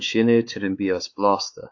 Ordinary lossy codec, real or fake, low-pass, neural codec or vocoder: AAC, 48 kbps; fake; 7.2 kHz; codec, 16 kHz, 4 kbps, X-Codec, WavLM features, trained on Multilingual LibriSpeech